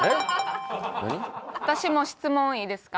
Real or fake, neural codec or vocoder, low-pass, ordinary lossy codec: real; none; none; none